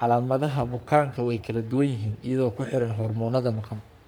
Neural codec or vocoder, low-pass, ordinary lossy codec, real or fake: codec, 44.1 kHz, 3.4 kbps, Pupu-Codec; none; none; fake